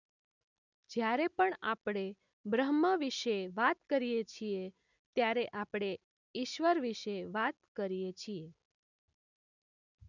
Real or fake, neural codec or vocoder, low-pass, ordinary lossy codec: real; none; 7.2 kHz; none